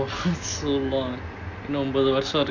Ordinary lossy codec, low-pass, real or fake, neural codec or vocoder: none; 7.2 kHz; real; none